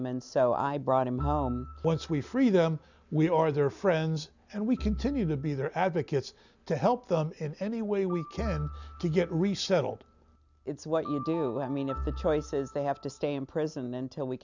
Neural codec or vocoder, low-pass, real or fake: none; 7.2 kHz; real